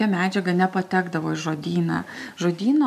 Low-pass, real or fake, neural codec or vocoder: 14.4 kHz; real; none